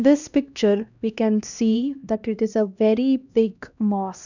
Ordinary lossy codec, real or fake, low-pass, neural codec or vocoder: none; fake; 7.2 kHz; codec, 16 kHz, 1 kbps, X-Codec, HuBERT features, trained on LibriSpeech